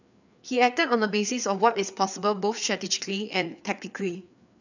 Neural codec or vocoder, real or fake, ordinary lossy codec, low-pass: codec, 16 kHz, 4 kbps, FreqCodec, larger model; fake; none; 7.2 kHz